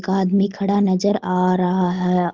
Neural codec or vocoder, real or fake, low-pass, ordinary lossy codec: none; real; 7.2 kHz; Opus, 16 kbps